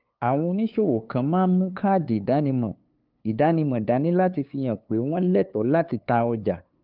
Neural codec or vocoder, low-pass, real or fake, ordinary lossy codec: codec, 16 kHz, 2 kbps, FunCodec, trained on LibriTTS, 25 frames a second; 5.4 kHz; fake; Opus, 24 kbps